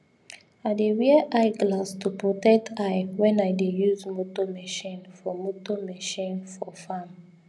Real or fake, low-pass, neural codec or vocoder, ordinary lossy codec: real; none; none; none